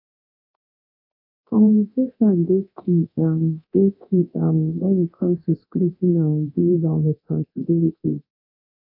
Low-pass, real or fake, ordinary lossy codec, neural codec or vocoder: 5.4 kHz; fake; AAC, 32 kbps; codec, 24 kHz, 0.9 kbps, DualCodec